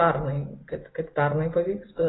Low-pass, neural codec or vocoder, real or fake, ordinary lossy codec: 7.2 kHz; none; real; AAC, 16 kbps